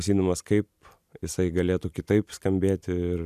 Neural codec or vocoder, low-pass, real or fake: none; 14.4 kHz; real